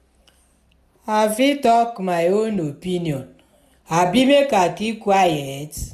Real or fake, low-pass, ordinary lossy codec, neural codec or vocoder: real; 14.4 kHz; none; none